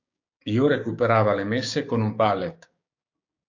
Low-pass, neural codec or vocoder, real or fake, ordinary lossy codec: 7.2 kHz; codec, 16 kHz, 6 kbps, DAC; fake; AAC, 48 kbps